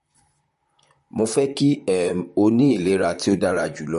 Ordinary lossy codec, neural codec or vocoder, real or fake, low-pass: MP3, 48 kbps; vocoder, 44.1 kHz, 128 mel bands, Pupu-Vocoder; fake; 14.4 kHz